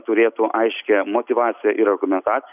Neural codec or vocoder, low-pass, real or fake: none; 3.6 kHz; real